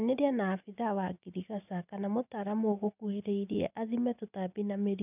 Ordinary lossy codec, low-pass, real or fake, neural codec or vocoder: none; 3.6 kHz; real; none